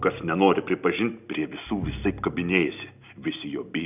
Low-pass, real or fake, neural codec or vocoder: 3.6 kHz; real; none